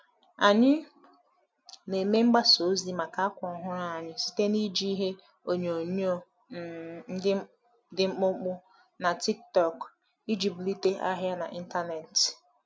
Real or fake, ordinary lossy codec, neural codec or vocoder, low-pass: real; none; none; none